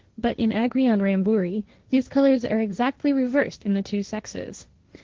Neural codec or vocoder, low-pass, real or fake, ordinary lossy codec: codec, 16 kHz, 1.1 kbps, Voila-Tokenizer; 7.2 kHz; fake; Opus, 16 kbps